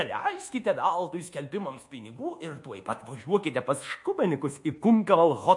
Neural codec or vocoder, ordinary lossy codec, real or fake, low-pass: codec, 24 kHz, 1.2 kbps, DualCodec; MP3, 48 kbps; fake; 10.8 kHz